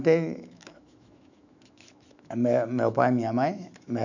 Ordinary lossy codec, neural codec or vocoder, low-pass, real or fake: none; autoencoder, 48 kHz, 128 numbers a frame, DAC-VAE, trained on Japanese speech; 7.2 kHz; fake